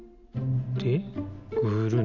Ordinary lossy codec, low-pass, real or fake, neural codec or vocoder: none; 7.2 kHz; real; none